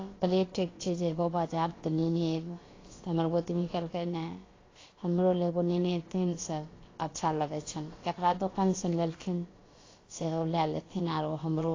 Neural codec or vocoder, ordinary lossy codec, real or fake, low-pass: codec, 16 kHz, about 1 kbps, DyCAST, with the encoder's durations; AAC, 32 kbps; fake; 7.2 kHz